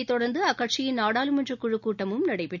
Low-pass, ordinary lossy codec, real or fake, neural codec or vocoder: 7.2 kHz; none; real; none